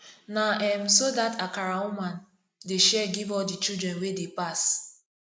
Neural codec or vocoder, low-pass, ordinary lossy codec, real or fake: none; none; none; real